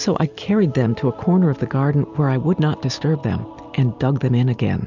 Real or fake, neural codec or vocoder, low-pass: real; none; 7.2 kHz